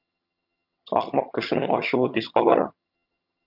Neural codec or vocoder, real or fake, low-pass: vocoder, 22.05 kHz, 80 mel bands, HiFi-GAN; fake; 5.4 kHz